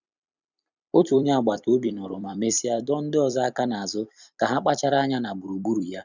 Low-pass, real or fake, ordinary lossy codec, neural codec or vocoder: 7.2 kHz; fake; none; vocoder, 44.1 kHz, 128 mel bands every 256 samples, BigVGAN v2